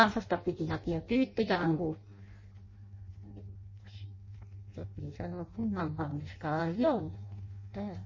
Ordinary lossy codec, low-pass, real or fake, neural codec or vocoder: MP3, 32 kbps; 7.2 kHz; fake; codec, 16 kHz in and 24 kHz out, 0.6 kbps, FireRedTTS-2 codec